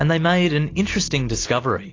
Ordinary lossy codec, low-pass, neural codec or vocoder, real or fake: AAC, 32 kbps; 7.2 kHz; none; real